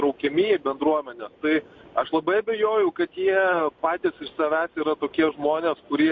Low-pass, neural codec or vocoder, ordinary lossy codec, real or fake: 7.2 kHz; none; MP3, 48 kbps; real